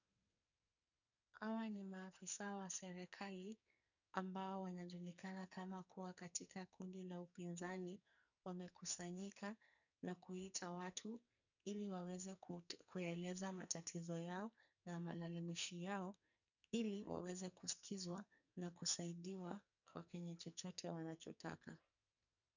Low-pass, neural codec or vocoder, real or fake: 7.2 kHz; codec, 44.1 kHz, 2.6 kbps, SNAC; fake